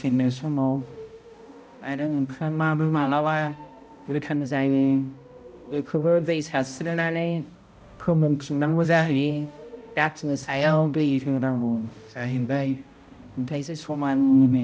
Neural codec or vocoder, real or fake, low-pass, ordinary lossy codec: codec, 16 kHz, 0.5 kbps, X-Codec, HuBERT features, trained on balanced general audio; fake; none; none